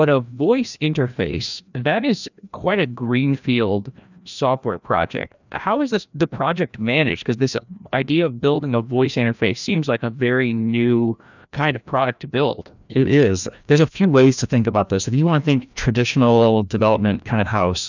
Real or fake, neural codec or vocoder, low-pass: fake; codec, 16 kHz, 1 kbps, FreqCodec, larger model; 7.2 kHz